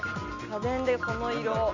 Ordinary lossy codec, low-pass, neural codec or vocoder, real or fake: Opus, 64 kbps; 7.2 kHz; none; real